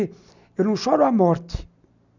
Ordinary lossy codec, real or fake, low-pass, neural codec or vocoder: none; real; 7.2 kHz; none